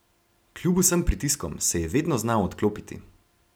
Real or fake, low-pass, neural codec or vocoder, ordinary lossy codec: real; none; none; none